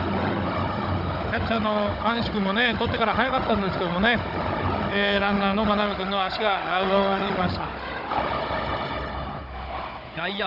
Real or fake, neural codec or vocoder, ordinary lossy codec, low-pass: fake; codec, 16 kHz, 16 kbps, FunCodec, trained on Chinese and English, 50 frames a second; none; 5.4 kHz